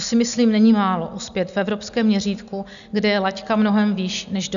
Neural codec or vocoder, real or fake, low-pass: none; real; 7.2 kHz